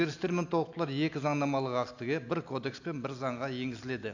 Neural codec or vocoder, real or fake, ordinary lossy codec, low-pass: none; real; AAC, 48 kbps; 7.2 kHz